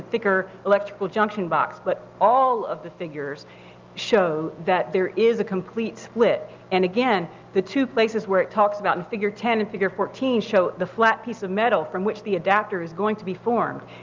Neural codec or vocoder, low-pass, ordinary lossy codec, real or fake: none; 7.2 kHz; Opus, 32 kbps; real